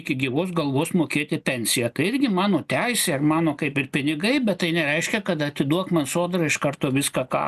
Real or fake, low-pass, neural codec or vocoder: real; 14.4 kHz; none